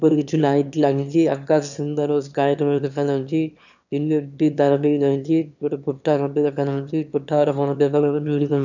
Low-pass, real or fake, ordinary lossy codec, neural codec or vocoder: 7.2 kHz; fake; none; autoencoder, 22.05 kHz, a latent of 192 numbers a frame, VITS, trained on one speaker